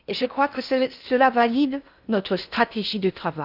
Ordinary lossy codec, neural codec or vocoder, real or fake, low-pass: none; codec, 16 kHz in and 24 kHz out, 0.6 kbps, FocalCodec, streaming, 4096 codes; fake; 5.4 kHz